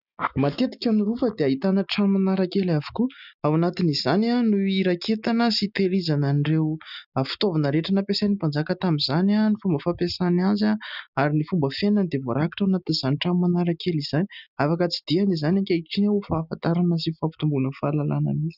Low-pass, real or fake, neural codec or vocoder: 5.4 kHz; real; none